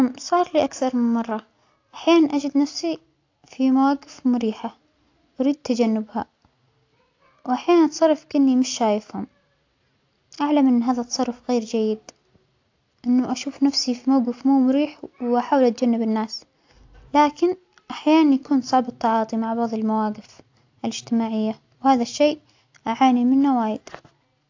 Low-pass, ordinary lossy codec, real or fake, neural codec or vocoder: 7.2 kHz; AAC, 48 kbps; real; none